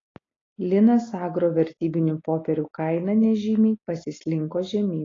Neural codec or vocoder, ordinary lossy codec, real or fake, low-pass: none; AAC, 32 kbps; real; 7.2 kHz